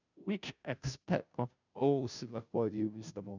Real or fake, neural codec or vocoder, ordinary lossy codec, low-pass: fake; codec, 16 kHz, 0.5 kbps, FunCodec, trained on Chinese and English, 25 frames a second; none; 7.2 kHz